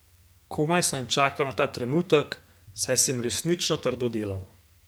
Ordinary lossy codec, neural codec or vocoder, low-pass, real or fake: none; codec, 44.1 kHz, 2.6 kbps, SNAC; none; fake